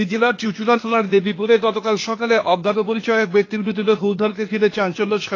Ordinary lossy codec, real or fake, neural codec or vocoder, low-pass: AAC, 32 kbps; fake; codec, 16 kHz, 0.8 kbps, ZipCodec; 7.2 kHz